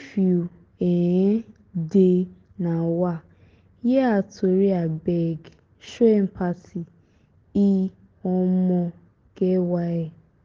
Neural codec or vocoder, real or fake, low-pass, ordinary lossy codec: none; real; 7.2 kHz; Opus, 16 kbps